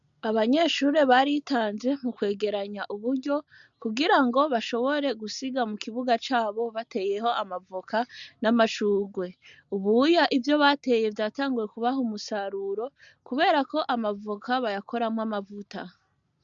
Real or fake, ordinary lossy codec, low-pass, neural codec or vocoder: real; MP3, 64 kbps; 7.2 kHz; none